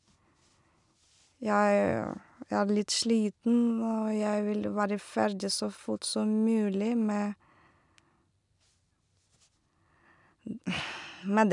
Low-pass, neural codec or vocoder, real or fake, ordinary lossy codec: 10.8 kHz; none; real; none